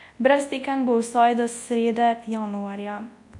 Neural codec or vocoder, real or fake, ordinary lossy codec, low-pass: codec, 24 kHz, 0.9 kbps, WavTokenizer, large speech release; fake; AAC, 64 kbps; 10.8 kHz